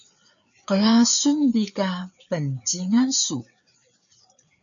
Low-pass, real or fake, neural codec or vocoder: 7.2 kHz; fake; codec, 16 kHz, 4 kbps, FreqCodec, larger model